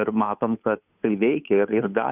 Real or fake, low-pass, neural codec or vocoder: fake; 3.6 kHz; codec, 16 kHz, 2 kbps, FunCodec, trained on Chinese and English, 25 frames a second